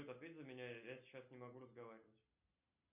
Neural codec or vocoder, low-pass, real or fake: none; 3.6 kHz; real